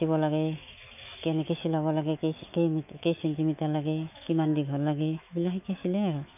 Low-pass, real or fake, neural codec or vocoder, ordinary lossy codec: 3.6 kHz; fake; autoencoder, 48 kHz, 128 numbers a frame, DAC-VAE, trained on Japanese speech; none